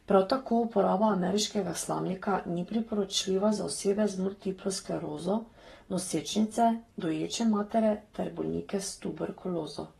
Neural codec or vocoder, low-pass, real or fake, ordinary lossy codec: codec, 44.1 kHz, 7.8 kbps, Pupu-Codec; 19.8 kHz; fake; AAC, 32 kbps